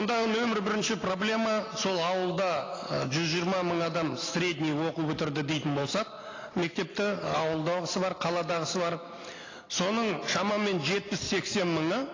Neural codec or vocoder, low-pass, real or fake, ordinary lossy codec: none; 7.2 kHz; real; AAC, 32 kbps